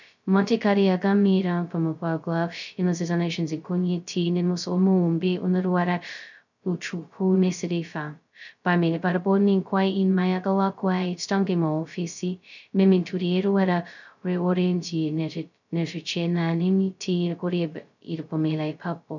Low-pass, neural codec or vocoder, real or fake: 7.2 kHz; codec, 16 kHz, 0.2 kbps, FocalCodec; fake